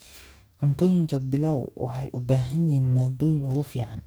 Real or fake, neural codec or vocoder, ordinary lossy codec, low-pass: fake; codec, 44.1 kHz, 2.6 kbps, DAC; none; none